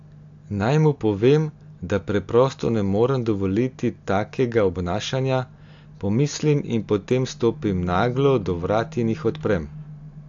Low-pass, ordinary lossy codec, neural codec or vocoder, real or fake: 7.2 kHz; AAC, 64 kbps; none; real